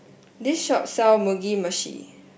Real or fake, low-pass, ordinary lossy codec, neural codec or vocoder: real; none; none; none